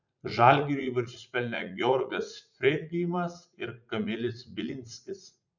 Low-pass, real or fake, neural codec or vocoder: 7.2 kHz; fake; vocoder, 44.1 kHz, 80 mel bands, Vocos